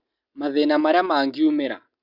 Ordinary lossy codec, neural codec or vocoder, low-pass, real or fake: Opus, 64 kbps; none; 5.4 kHz; real